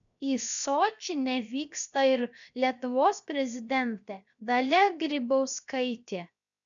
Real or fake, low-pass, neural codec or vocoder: fake; 7.2 kHz; codec, 16 kHz, 0.7 kbps, FocalCodec